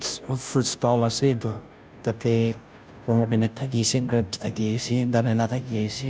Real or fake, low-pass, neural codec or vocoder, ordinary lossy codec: fake; none; codec, 16 kHz, 0.5 kbps, FunCodec, trained on Chinese and English, 25 frames a second; none